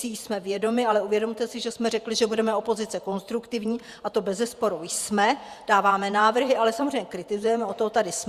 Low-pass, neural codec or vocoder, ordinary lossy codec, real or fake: 14.4 kHz; vocoder, 48 kHz, 128 mel bands, Vocos; Opus, 64 kbps; fake